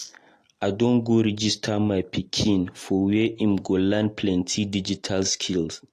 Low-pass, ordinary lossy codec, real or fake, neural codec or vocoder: 14.4 kHz; AAC, 48 kbps; real; none